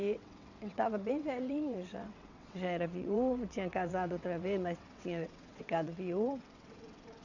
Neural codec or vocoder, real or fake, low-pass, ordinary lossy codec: vocoder, 22.05 kHz, 80 mel bands, WaveNeXt; fake; 7.2 kHz; none